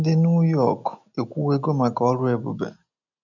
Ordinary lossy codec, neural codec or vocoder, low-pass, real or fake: none; none; 7.2 kHz; real